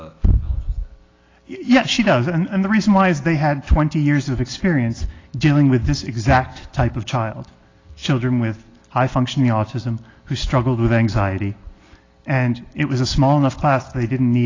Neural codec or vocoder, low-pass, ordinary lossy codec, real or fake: none; 7.2 kHz; AAC, 32 kbps; real